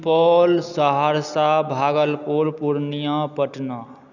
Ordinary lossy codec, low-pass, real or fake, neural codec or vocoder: none; 7.2 kHz; real; none